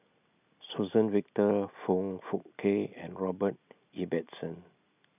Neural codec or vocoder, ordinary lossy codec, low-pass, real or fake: none; none; 3.6 kHz; real